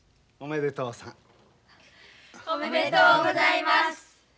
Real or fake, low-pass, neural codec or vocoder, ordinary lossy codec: real; none; none; none